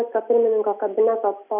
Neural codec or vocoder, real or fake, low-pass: none; real; 3.6 kHz